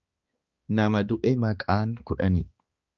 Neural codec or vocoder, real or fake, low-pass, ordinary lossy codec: codec, 16 kHz, 2 kbps, X-Codec, HuBERT features, trained on balanced general audio; fake; 7.2 kHz; Opus, 24 kbps